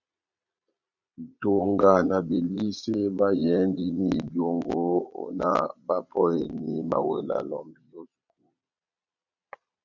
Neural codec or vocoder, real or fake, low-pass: vocoder, 22.05 kHz, 80 mel bands, Vocos; fake; 7.2 kHz